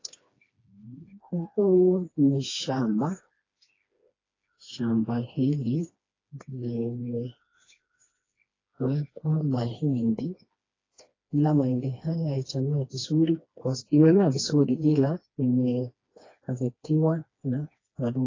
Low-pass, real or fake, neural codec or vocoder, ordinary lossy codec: 7.2 kHz; fake; codec, 16 kHz, 2 kbps, FreqCodec, smaller model; AAC, 32 kbps